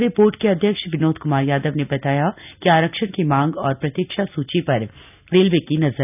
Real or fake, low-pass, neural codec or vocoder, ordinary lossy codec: real; 3.6 kHz; none; none